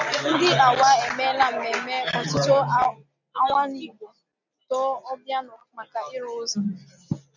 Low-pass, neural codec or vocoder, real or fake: 7.2 kHz; none; real